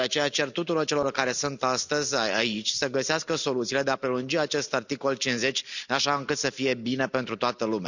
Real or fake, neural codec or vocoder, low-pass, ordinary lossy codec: real; none; 7.2 kHz; none